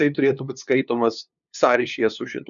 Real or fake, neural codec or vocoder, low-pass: fake; codec, 16 kHz, 2 kbps, FunCodec, trained on LibriTTS, 25 frames a second; 7.2 kHz